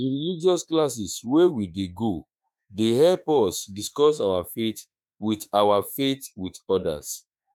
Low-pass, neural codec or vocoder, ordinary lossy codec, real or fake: none; autoencoder, 48 kHz, 32 numbers a frame, DAC-VAE, trained on Japanese speech; none; fake